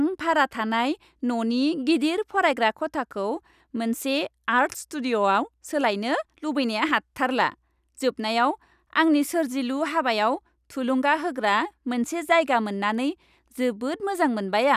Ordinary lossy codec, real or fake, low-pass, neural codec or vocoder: none; real; 14.4 kHz; none